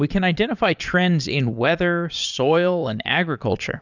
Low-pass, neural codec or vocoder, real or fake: 7.2 kHz; vocoder, 22.05 kHz, 80 mel bands, Vocos; fake